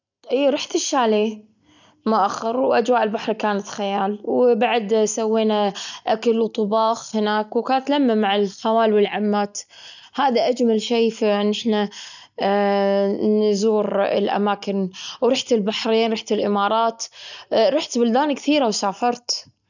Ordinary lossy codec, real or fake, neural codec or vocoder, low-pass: none; real; none; 7.2 kHz